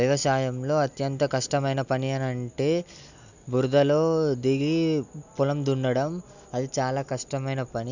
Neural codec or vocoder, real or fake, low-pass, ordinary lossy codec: none; real; 7.2 kHz; none